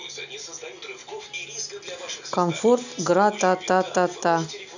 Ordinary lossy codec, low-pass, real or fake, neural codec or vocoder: none; 7.2 kHz; real; none